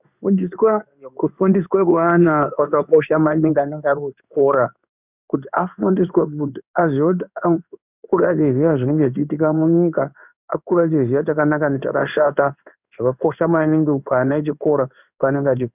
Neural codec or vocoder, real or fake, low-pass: codec, 16 kHz in and 24 kHz out, 1 kbps, XY-Tokenizer; fake; 3.6 kHz